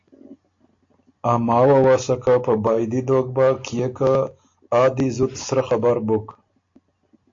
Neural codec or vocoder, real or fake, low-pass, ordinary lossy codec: none; real; 7.2 kHz; AAC, 48 kbps